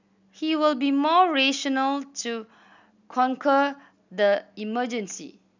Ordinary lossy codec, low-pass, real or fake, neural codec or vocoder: none; 7.2 kHz; real; none